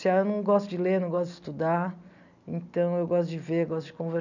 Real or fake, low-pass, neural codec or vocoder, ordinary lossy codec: real; 7.2 kHz; none; none